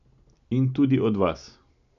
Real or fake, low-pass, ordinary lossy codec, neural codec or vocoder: real; 7.2 kHz; AAC, 96 kbps; none